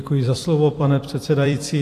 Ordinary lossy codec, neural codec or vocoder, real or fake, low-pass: AAC, 64 kbps; vocoder, 44.1 kHz, 128 mel bands every 256 samples, BigVGAN v2; fake; 14.4 kHz